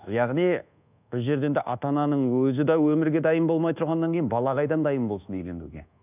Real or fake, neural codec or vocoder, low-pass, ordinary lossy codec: fake; codec, 24 kHz, 1.2 kbps, DualCodec; 3.6 kHz; none